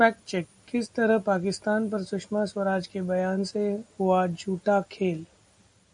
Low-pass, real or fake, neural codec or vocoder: 9.9 kHz; real; none